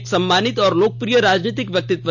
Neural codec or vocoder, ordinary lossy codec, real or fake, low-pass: none; none; real; none